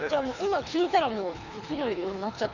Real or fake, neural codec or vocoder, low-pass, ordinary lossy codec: fake; codec, 24 kHz, 3 kbps, HILCodec; 7.2 kHz; none